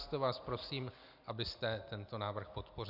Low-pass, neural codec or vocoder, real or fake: 5.4 kHz; none; real